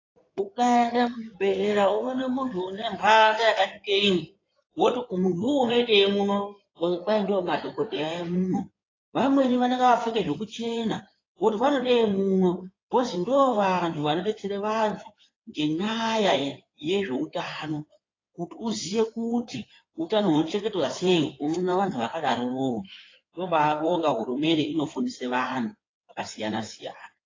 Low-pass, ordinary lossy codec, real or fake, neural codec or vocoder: 7.2 kHz; AAC, 32 kbps; fake; codec, 16 kHz in and 24 kHz out, 2.2 kbps, FireRedTTS-2 codec